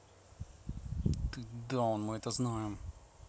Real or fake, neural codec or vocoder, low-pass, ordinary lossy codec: real; none; none; none